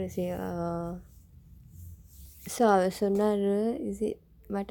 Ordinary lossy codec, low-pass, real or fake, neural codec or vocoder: none; 19.8 kHz; real; none